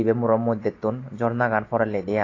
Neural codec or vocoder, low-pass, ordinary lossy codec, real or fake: none; 7.2 kHz; AAC, 32 kbps; real